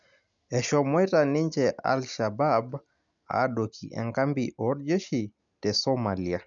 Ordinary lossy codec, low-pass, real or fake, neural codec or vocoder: none; 7.2 kHz; real; none